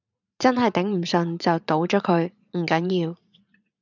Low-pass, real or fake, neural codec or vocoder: 7.2 kHz; fake; vocoder, 44.1 kHz, 80 mel bands, Vocos